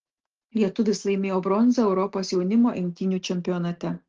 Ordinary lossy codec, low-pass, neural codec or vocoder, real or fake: Opus, 16 kbps; 7.2 kHz; none; real